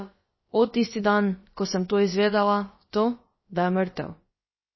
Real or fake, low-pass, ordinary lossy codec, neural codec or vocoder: fake; 7.2 kHz; MP3, 24 kbps; codec, 16 kHz, about 1 kbps, DyCAST, with the encoder's durations